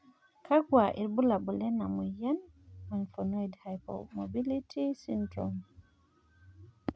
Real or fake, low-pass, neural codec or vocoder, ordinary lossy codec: real; none; none; none